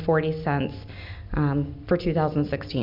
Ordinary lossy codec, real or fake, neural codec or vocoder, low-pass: MP3, 48 kbps; real; none; 5.4 kHz